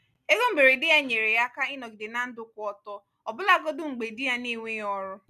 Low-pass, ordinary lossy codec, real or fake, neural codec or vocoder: 14.4 kHz; none; real; none